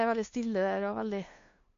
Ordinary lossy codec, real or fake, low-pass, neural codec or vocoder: AAC, 48 kbps; fake; 7.2 kHz; codec, 16 kHz, 2 kbps, FunCodec, trained on LibriTTS, 25 frames a second